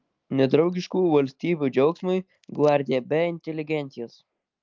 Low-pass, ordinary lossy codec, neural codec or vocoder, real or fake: 7.2 kHz; Opus, 32 kbps; none; real